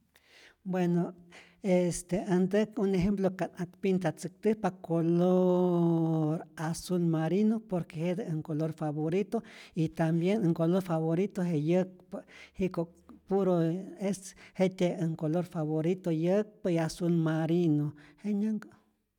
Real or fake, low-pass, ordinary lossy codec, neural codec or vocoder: real; 19.8 kHz; none; none